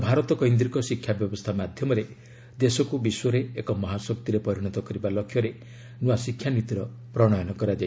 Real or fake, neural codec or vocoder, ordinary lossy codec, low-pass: real; none; none; none